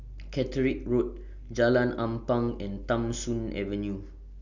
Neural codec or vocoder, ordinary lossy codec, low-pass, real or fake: none; none; 7.2 kHz; real